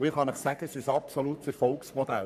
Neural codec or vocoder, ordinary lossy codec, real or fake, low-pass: codec, 44.1 kHz, 3.4 kbps, Pupu-Codec; none; fake; 14.4 kHz